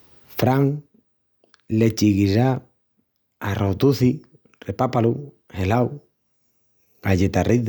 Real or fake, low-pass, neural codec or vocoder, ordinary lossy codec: real; none; none; none